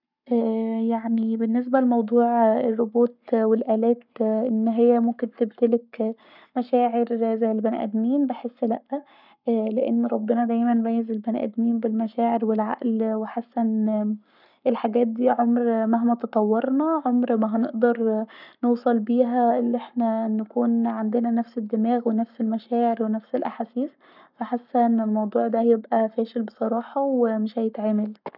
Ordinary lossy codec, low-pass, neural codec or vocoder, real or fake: none; 5.4 kHz; codec, 44.1 kHz, 7.8 kbps, Pupu-Codec; fake